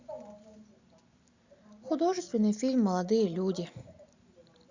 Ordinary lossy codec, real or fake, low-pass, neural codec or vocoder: Opus, 64 kbps; real; 7.2 kHz; none